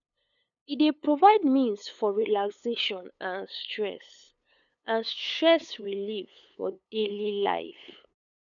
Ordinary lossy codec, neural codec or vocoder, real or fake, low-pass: none; codec, 16 kHz, 8 kbps, FunCodec, trained on LibriTTS, 25 frames a second; fake; 7.2 kHz